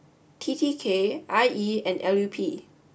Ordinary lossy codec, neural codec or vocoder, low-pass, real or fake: none; none; none; real